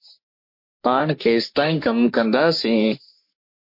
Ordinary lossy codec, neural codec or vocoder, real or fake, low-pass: MP3, 32 kbps; codec, 44.1 kHz, 3.4 kbps, Pupu-Codec; fake; 5.4 kHz